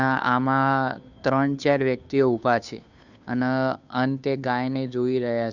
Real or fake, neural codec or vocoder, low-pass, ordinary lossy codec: fake; codec, 16 kHz, 2 kbps, FunCodec, trained on Chinese and English, 25 frames a second; 7.2 kHz; none